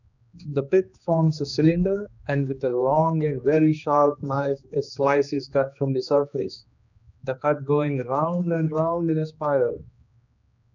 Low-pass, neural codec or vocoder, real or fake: 7.2 kHz; codec, 16 kHz, 2 kbps, X-Codec, HuBERT features, trained on general audio; fake